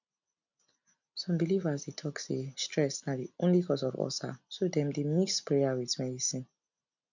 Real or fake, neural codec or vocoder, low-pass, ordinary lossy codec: real; none; 7.2 kHz; none